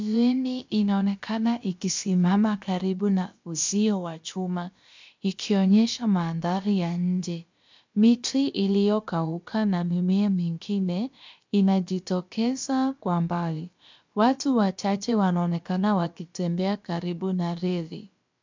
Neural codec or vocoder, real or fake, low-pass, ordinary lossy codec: codec, 16 kHz, about 1 kbps, DyCAST, with the encoder's durations; fake; 7.2 kHz; MP3, 64 kbps